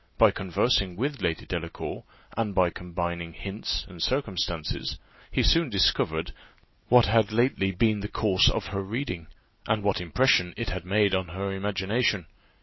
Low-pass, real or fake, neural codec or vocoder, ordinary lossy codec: 7.2 kHz; real; none; MP3, 24 kbps